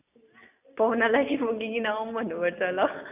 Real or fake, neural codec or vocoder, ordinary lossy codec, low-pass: real; none; none; 3.6 kHz